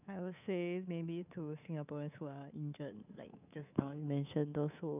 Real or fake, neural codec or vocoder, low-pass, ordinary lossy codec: fake; codec, 24 kHz, 3.1 kbps, DualCodec; 3.6 kHz; none